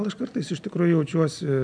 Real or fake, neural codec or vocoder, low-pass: real; none; 9.9 kHz